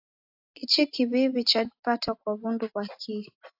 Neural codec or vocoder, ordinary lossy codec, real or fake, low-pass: none; AAC, 48 kbps; real; 5.4 kHz